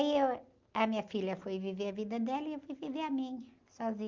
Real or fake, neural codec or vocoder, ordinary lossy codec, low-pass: real; none; Opus, 24 kbps; 7.2 kHz